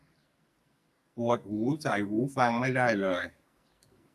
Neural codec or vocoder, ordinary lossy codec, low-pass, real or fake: codec, 44.1 kHz, 2.6 kbps, SNAC; none; 14.4 kHz; fake